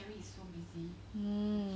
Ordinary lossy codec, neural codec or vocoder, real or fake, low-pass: none; none; real; none